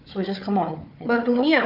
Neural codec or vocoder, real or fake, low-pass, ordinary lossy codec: codec, 16 kHz, 4 kbps, FunCodec, trained on Chinese and English, 50 frames a second; fake; 5.4 kHz; none